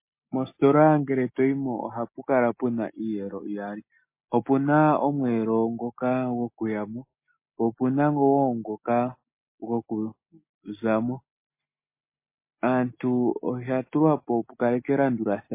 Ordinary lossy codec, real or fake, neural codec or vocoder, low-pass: MP3, 24 kbps; real; none; 3.6 kHz